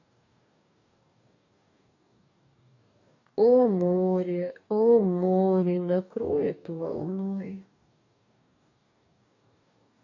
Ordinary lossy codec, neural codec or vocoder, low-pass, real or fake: none; codec, 44.1 kHz, 2.6 kbps, DAC; 7.2 kHz; fake